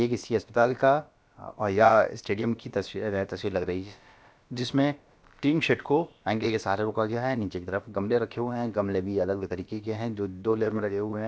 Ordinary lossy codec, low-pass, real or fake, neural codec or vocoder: none; none; fake; codec, 16 kHz, about 1 kbps, DyCAST, with the encoder's durations